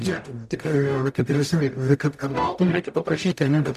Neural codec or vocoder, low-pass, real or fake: codec, 44.1 kHz, 0.9 kbps, DAC; 14.4 kHz; fake